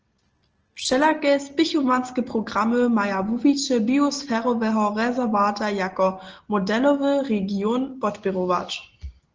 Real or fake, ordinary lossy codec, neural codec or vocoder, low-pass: real; Opus, 16 kbps; none; 7.2 kHz